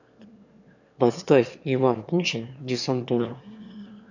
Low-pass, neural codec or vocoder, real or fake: 7.2 kHz; autoencoder, 22.05 kHz, a latent of 192 numbers a frame, VITS, trained on one speaker; fake